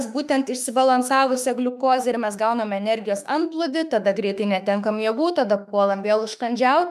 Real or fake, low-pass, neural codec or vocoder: fake; 14.4 kHz; autoencoder, 48 kHz, 32 numbers a frame, DAC-VAE, trained on Japanese speech